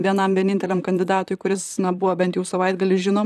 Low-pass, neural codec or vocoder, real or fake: 14.4 kHz; vocoder, 44.1 kHz, 128 mel bands, Pupu-Vocoder; fake